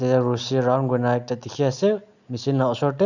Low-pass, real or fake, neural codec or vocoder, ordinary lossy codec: 7.2 kHz; real; none; none